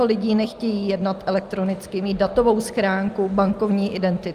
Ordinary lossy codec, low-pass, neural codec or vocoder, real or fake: Opus, 32 kbps; 14.4 kHz; none; real